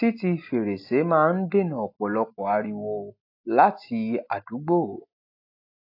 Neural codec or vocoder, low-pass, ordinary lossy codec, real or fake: none; 5.4 kHz; AAC, 32 kbps; real